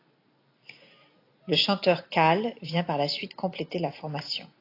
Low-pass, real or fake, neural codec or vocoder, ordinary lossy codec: 5.4 kHz; real; none; AAC, 32 kbps